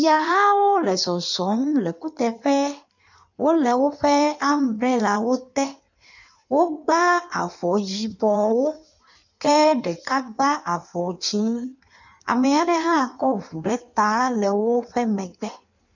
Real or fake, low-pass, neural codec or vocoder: fake; 7.2 kHz; codec, 16 kHz in and 24 kHz out, 1.1 kbps, FireRedTTS-2 codec